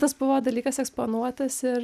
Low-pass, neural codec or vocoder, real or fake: 14.4 kHz; none; real